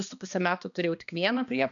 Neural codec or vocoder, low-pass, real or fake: codec, 16 kHz, 2 kbps, X-Codec, HuBERT features, trained on balanced general audio; 7.2 kHz; fake